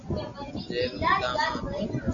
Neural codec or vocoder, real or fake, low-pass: none; real; 7.2 kHz